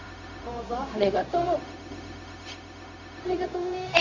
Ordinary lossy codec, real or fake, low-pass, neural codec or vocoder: AAC, 48 kbps; fake; 7.2 kHz; codec, 16 kHz, 0.4 kbps, LongCat-Audio-Codec